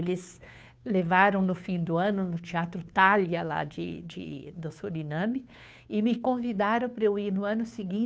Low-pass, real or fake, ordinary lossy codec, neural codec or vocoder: none; fake; none; codec, 16 kHz, 2 kbps, FunCodec, trained on Chinese and English, 25 frames a second